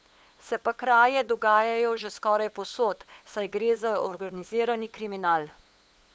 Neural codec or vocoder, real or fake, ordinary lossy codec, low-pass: codec, 16 kHz, 8 kbps, FunCodec, trained on LibriTTS, 25 frames a second; fake; none; none